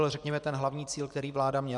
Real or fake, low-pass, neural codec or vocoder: fake; 10.8 kHz; vocoder, 24 kHz, 100 mel bands, Vocos